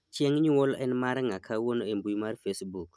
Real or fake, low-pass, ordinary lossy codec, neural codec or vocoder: real; none; none; none